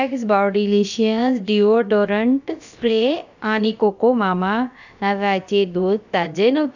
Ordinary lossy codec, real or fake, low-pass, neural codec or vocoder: none; fake; 7.2 kHz; codec, 16 kHz, about 1 kbps, DyCAST, with the encoder's durations